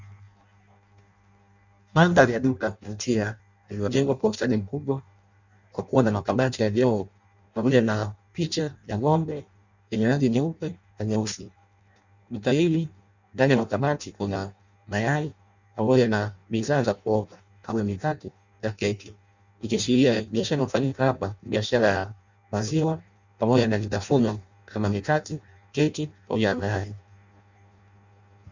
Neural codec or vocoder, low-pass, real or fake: codec, 16 kHz in and 24 kHz out, 0.6 kbps, FireRedTTS-2 codec; 7.2 kHz; fake